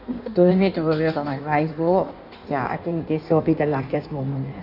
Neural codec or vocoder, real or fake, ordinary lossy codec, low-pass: codec, 16 kHz in and 24 kHz out, 1.1 kbps, FireRedTTS-2 codec; fake; none; 5.4 kHz